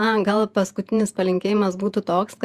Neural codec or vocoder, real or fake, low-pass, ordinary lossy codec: vocoder, 48 kHz, 128 mel bands, Vocos; fake; 14.4 kHz; Opus, 64 kbps